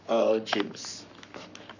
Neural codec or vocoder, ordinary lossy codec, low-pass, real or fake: codec, 44.1 kHz, 7.8 kbps, Pupu-Codec; none; 7.2 kHz; fake